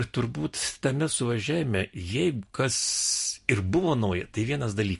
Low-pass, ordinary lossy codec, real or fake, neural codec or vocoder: 14.4 kHz; MP3, 48 kbps; real; none